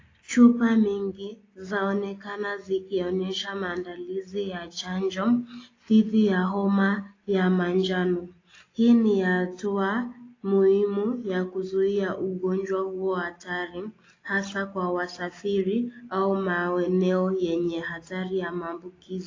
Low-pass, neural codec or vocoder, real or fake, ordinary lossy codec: 7.2 kHz; none; real; AAC, 32 kbps